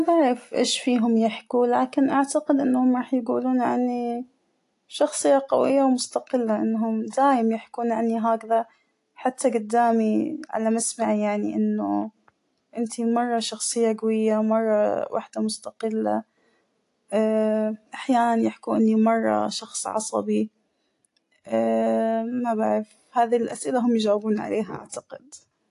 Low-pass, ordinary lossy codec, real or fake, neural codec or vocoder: 10.8 kHz; AAC, 48 kbps; real; none